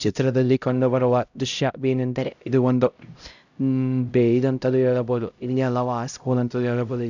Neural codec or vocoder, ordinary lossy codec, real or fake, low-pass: codec, 16 kHz, 0.5 kbps, X-Codec, HuBERT features, trained on LibriSpeech; none; fake; 7.2 kHz